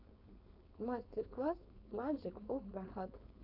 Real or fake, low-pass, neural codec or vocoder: fake; 5.4 kHz; codec, 16 kHz, 4.8 kbps, FACodec